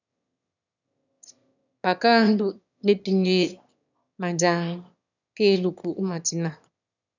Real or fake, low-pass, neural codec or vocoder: fake; 7.2 kHz; autoencoder, 22.05 kHz, a latent of 192 numbers a frame, VITS, trained on one speaker